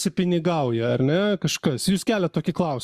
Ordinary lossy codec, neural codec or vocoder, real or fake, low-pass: Opus, 64 kbps; codec, 44.1 kHz, 7.8 kbps, Pupu-Codec; fake; 14.4 kHz